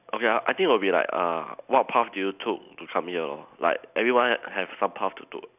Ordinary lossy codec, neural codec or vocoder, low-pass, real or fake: none; none; 3.6 kHz; real